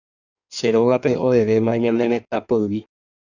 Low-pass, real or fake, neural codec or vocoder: 7.2 kHz; fake; codec, 16 kHz in and 24 kHz out, 1.1 kbps, FireRedTTS-2 codec